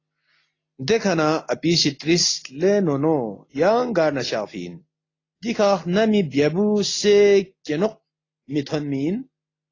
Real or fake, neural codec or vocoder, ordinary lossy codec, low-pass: real; none; AAC, 32 kbps; 7.2 kHz